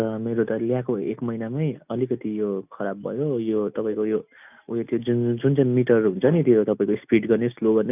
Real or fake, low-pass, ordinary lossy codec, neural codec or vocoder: real; 3.6 kHz; none; none